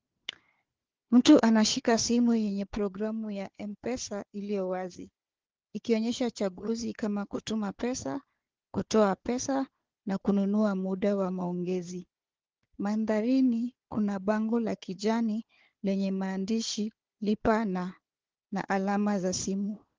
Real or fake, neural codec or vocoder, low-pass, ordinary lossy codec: fake; codec, 16 kHz, 4 kbps, FunCodec, trained on Chinese and English, 50 frames a second; 7.2 kHz; Opus, 16 kbps